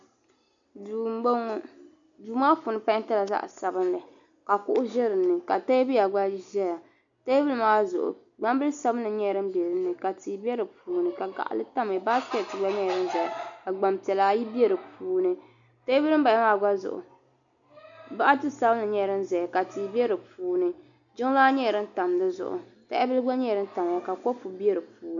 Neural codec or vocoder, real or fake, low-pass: none; real; 7.2 kHz